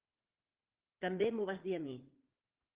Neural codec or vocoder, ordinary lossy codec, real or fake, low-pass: vocoder, 44.1 kHz, 80 mel bands, Vocos; Opus, 16 kbps; fake; 3.6 kHz